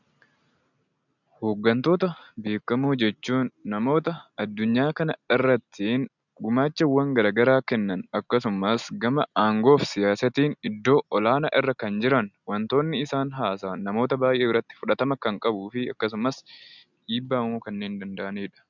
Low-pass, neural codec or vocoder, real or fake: 7.2 kHz; none; real